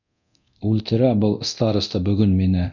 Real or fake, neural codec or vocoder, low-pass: fake; codec, 24 kHz, 0.9 kbps, DualCodec; 7.2 kHz